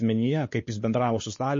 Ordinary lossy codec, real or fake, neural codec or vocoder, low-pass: MP3, 32 kbps; fake; codec, 16 kHz, 4 kbps, X-Codec, WavLM features, trained on Multilingual LibriSpeech; 7.2 kHz